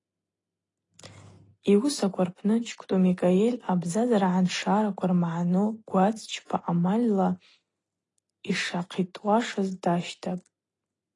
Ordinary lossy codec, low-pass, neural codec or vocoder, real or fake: AAC, 32 kbps; 10.8 kHz; none; real